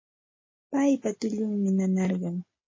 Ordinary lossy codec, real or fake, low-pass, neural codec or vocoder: MP3, 32 kbps; real; 7.2 kHz; none